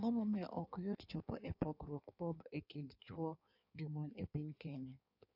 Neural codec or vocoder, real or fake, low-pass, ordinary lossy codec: codec, 16 kHz in and 24 kHz out, 1.1 kbps, FireRedTTS-2 codec; fake; 5.4 kHz; AAC, 48 kbps